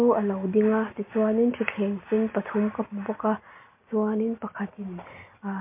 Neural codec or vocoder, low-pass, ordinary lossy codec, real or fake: none; 3.6 kHz; MP3, 32 kbps; real